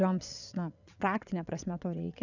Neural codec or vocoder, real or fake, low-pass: vocoder, 22.05 kHz, 80 mel bands, WaveNeXt; fake; 7.2 kHz